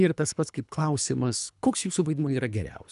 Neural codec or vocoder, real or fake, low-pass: codec, 24 kHz, 3 kbps, HILCodec; fake; 10.8 kHz